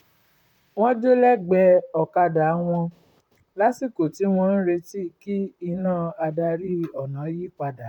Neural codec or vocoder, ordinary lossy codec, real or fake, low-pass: vocoder, 44.1 kHz, 128 mel bands, Pupu-Vocoder; none; fake; 19.8 kHz